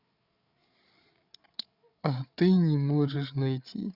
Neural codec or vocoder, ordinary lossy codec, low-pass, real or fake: codec, 16 kHz, 8 kbps, FreqCodec, larger model; Opus, 64 kbps; 5.4 kHz; fake